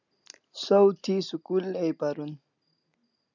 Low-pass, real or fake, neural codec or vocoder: 7.2 kHz; real; none